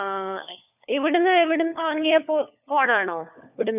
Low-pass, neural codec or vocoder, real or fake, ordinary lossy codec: 3.6 kHz; codec, 16 kHz, 4 kbps, FunCodec, trained on LibriTTS, 50 frames a second; fake; none